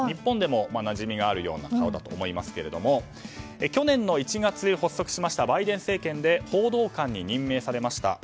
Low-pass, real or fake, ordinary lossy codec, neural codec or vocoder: none; real; none; none